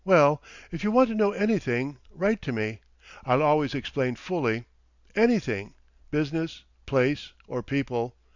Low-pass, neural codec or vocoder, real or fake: 7.2 kHz; none; real